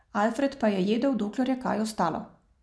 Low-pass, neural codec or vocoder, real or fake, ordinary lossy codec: none; none; real; none